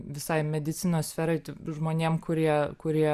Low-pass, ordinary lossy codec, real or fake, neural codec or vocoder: 14.4 kHz; Opus, 64 kbps; real; none